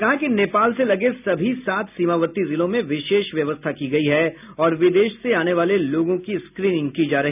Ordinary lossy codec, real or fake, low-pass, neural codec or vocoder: none; real; 3.6 kHz; none